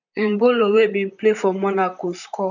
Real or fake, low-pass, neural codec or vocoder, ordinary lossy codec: fake; 7.2 kHz; vocoder, 44.1 kHz, 128 mel bands, Pupu-Vocoder; none